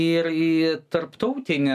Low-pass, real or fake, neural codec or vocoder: 14.4 kHz; real; none